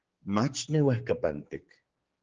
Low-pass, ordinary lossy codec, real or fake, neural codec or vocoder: 7.2 kHz; Opus, 16 kbps; fake; codec, 16 kHz, 4 kbps, X-Codec, HuBERT features, trained on general audio